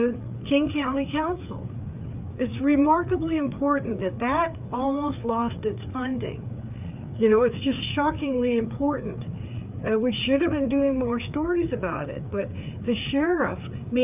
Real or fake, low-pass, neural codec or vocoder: fake; 3.6 kHz; codec, 16 kHz, 4 kbps, FreqCodec, larger model